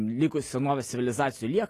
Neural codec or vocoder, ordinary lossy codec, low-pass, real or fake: none; AAC, 48 kbps; 14.4 kHz; real